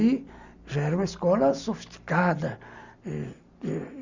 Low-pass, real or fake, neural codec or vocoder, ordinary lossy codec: 7.2 kHz; real; none; Opus, 64 kbps